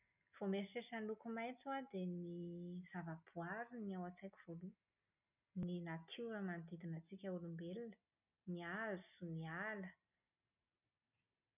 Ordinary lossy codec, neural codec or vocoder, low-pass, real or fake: none; none; 3.6 kHz; real